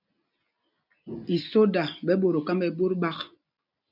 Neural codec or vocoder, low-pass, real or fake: none; 5.4 kHz; real